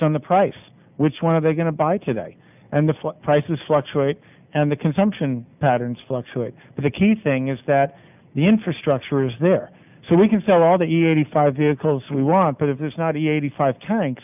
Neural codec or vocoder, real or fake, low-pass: none; real; 3.6 kHz